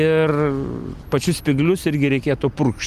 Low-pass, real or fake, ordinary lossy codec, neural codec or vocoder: 14.4 kHz; real; Opus, 32 kbps; none